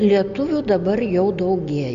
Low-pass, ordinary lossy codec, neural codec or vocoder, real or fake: 7.2 kHz; Opus, 64 kbps; none; real